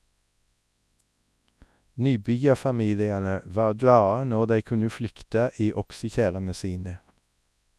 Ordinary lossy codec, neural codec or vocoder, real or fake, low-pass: none; codec, 24 kHz, 0.9 kbps, WavTokenizer, large speech release; fake; none